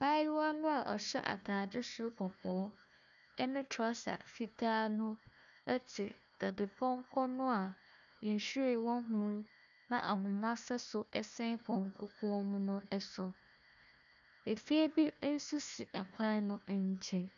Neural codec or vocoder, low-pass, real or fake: codec, 16 kHz, 1 kbps, FunCodec, trained on Chinese and English, 50 frames a second; 7.2 kHz; fake